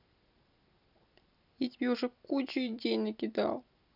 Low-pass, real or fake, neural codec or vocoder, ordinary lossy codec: 5.4 kHz; real; none; none